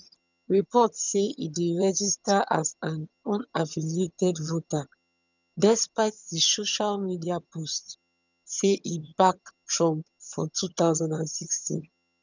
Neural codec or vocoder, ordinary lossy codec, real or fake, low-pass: vocoder, 22.05 kHz, 80 mel bands, HiFi-GAN; none; fake; 7.2 kHz